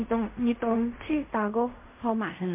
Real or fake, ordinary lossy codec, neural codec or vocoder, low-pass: fake; MP3, 32 kbps; codec, 16 kHz in and 24 kHz out, 0.4 kbps, LongCat-Audio-Codec, fine tuned four codebook decoder; 3.6 kHz